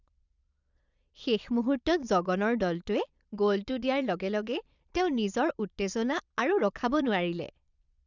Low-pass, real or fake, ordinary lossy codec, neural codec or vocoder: 7.2 kHz; fake; none; vocoder, 22.05 kHz, 80 mel bands, Vocos